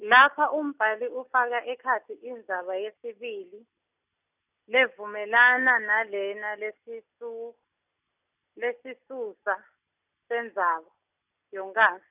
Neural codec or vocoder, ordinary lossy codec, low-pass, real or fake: none; none; 3.6 kHz; real